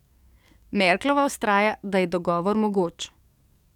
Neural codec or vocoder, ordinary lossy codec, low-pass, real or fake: codec, 44.1 kHz, 7.8 kbps, DAC; none; 19.8 kHz; fake